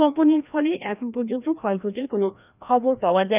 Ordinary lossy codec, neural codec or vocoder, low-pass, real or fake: none; codec, 16 kHz, 1 kbps, FreqCodec, larger model; 3.6 kHz; fake